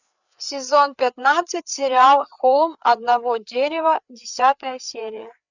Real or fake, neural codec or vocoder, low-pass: fake; codec, 16 kHz, 4 kbps, FreqCodec, larger model; 7.2 kHz